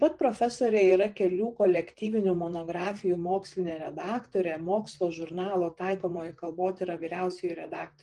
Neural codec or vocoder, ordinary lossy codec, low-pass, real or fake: vocoder, 44.1 kHz, 128 mel bands, Pupu-Vocoder; Opus, 32 kbps; 10.8 kHz; fake